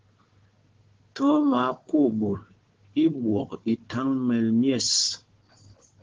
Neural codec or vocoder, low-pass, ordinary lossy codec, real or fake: codec, 16 kHz, 4 kbps, FunCodec, trained on Chinese and English, 50 frames a second; 7.2 kHz; Opus, 16 kbps; fake